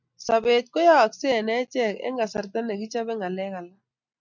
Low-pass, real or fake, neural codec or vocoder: 7.2 kHz; real; none